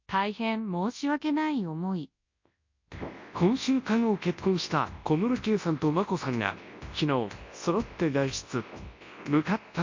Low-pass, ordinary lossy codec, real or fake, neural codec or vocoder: 7.2 kHz; MP3, 48 kbps; fake; codec, 24 kHz, 0.9 kbps, WavTokenizer, large speech release